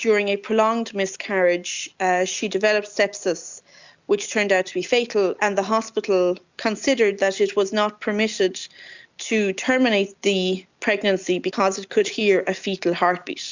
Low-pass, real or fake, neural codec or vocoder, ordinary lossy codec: 7.2 kHz; real; none; Opus, 64 kbps